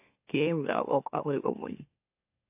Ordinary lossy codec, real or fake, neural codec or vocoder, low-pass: AAC, 32 kbps; fake; autoencoder, 44.1 kHz, a latent of 192 numbers a frame, MeloTTS; 3.6 kHz